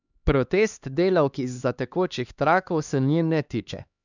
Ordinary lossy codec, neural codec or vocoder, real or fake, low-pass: none; codec, 16 kHz, 2 kbps, X-Codec, HuBERT features, trained on LibriSpeech; fake; 7.2 kHz